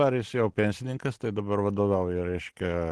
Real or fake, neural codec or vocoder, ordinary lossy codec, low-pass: real; none; Opus, 16 kbps; 10.8 kHz